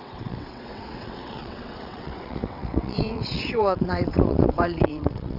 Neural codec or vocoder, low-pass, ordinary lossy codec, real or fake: vocoder, 22.05 kHz, 80 mel bands, WaveNeXt; 5.4 kHz; none; fake